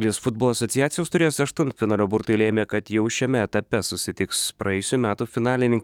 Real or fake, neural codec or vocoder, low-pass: fake; codec, 44.1 kHz, 7.8 kbps, DAC; 19.8 kHz